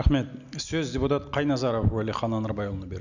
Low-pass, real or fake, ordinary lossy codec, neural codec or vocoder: 7.2 kHz; real; none; none